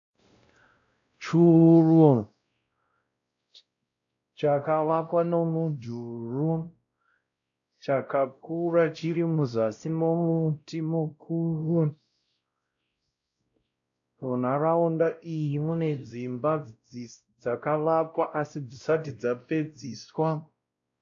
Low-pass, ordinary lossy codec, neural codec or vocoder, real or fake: 7.2 kHz; AAC, 64 kbps; codec, 16 kHz, 0.5 kbps, X-Codec, WavLM features, trained on Multilingual LibriSpeech; fake